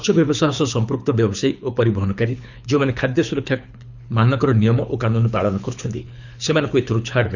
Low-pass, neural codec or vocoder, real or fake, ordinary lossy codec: 7.2 kHz; codec, 24 kHz, 6 kbps, HILCodec; fake; none